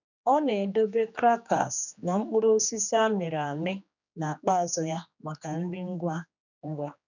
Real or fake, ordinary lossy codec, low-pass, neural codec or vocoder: fake; none; 7.2 kHz; codec, 16 kHz, 2 kbps, X-Codec, HuBERT features, trained on general audio